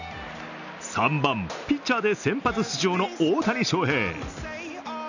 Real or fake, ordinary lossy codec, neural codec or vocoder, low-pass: real; none; none; 7.2 kHz